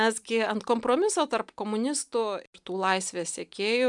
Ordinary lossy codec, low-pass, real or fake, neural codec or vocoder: MP3, 96 kbps; 10.8 kHz; real; none